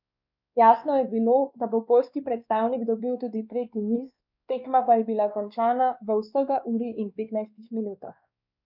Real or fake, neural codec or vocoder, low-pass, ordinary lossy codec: fake; codec, 16 kHz, 2 kbps, X-Codec, WavLM features, trained on Multilingual LibriSpeech; 5.4 kHz; none